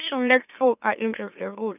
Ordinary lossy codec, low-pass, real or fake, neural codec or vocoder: none; 3.6 kHz; fake; autoencoder, 44.1 kHz, a latent of 192 numbers a frame, MeloTTS